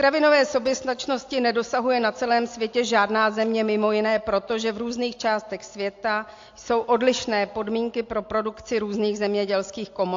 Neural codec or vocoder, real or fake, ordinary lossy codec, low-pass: none; real; AAC, 48 kbps; 7.2 kHz